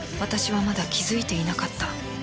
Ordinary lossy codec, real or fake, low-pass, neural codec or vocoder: none; real; none; none